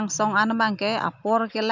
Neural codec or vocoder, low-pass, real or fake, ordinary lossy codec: none; 7.2 kHz; real; none